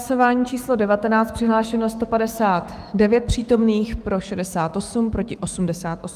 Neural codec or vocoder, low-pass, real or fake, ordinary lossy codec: autoencoder, 48 kHz, 128 numbers a frame, DAC-VAE, trained on Japanese speech; 14.4 kHz; fake; Opus, 32 kbps